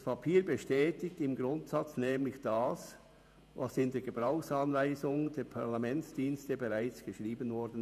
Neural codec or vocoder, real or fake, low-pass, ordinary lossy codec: vocoder, 48 kHz, 128 mel bands, Vocos; fake; 14.4 kHz; none